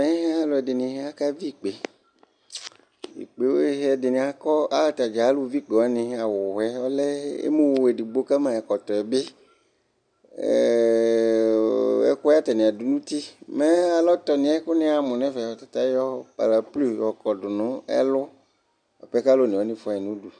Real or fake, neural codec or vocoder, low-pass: real; none; 9.9 kHz